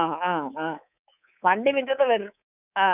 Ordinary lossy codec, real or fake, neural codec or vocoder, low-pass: none; fake; codec, 24 kHz, 3.1 kbps, DualCodec; 3.6 kHz